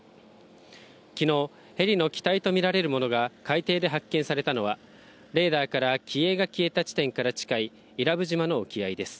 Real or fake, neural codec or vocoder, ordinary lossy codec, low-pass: real; none; none; none